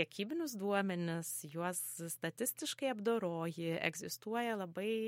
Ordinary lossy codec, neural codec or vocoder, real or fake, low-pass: MP3, 64 kbps; none; real; 19.8 kHz